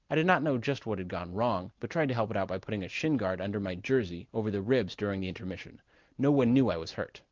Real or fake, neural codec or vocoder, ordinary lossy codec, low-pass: real; none; Opus, 32 kbps; 7.2 kHz